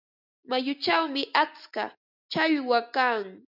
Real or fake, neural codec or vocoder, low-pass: real; none; 5.4 kHz